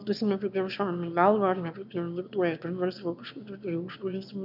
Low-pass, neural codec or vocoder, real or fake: 5.4 kHz; autoencoder, 22.05 kHz, a latent of 192 numbers a frame, VITS, trained on one speaker; fake